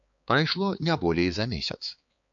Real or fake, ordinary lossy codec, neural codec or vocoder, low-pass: fake; MP3, 48 kbps; codec, 16 kHz, 4 kbps, X-Codec, HuBERT features, trained on balanced general audio; 7.2 kHz